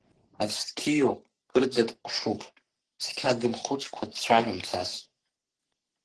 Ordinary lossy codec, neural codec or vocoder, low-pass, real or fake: Opus, 16 kbps; codec, 44.1 kHz, 3.4 kbps, Pupu-Codec; 10.8 kHz; fake